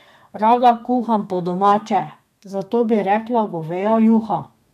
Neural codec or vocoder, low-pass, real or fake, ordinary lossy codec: codec, 32 kHz, 1.9 kbps, SNAC; 14.4 kHz; fake; none